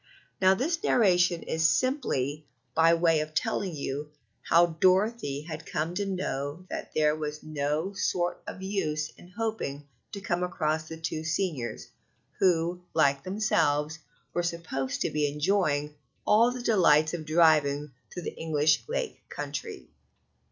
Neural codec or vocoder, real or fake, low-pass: none; real; 7.2 kHz